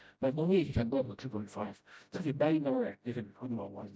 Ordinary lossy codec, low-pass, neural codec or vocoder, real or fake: none; none; codec, 16 kHz, 0.5 kbps, FreqCodec, smaller model; fake